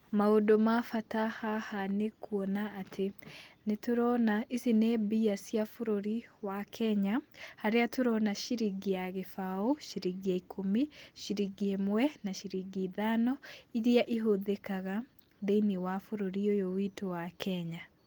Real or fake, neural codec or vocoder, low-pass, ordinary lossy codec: real; none; 19.8 kHz; Opus, 24 kbps